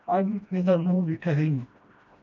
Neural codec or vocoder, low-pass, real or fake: codec, 16 kHz, 1 kbps, FreqCodec, smaller model; 7.2 kHz; fake